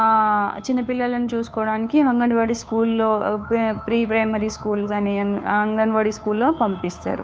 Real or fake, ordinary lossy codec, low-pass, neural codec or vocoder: fake; none; none; codec, 16 kHz, 2 kbps, FunCodec, trained on Chinese and English, 25 frames a second